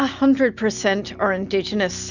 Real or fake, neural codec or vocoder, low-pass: real; none; 7.2 kHz